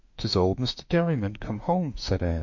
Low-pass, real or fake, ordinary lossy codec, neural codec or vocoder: 7.2 kHz; fake; MP3, 48 kbps; autoencoder, 48 kHz, 32 numbers a frame, DAC-VAE, trained on Japanese speech